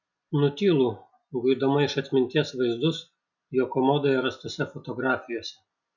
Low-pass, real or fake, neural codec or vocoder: 7.2 kHz; real; none